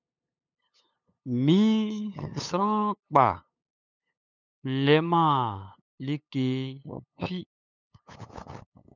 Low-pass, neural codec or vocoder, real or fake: 7.2 kHz; codec, 16 kHz, 8 kbps, FunCodec, trained on LibriTTS, 25 frames a second; fake